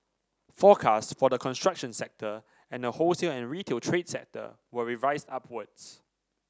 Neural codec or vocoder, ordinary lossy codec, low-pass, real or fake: none; none; none; real